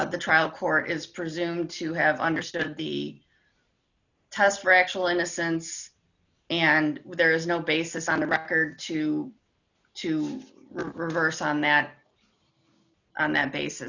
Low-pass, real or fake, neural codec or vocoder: 7.2 kHz; real; none